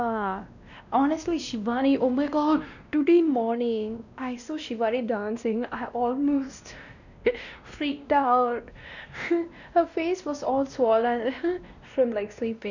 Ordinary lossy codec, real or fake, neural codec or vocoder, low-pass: none; fake; codec, 16 kHz, 1 kbps, X-Codec, WavLM features, trained on Multilingual LibriSpeech; 7.2 kHz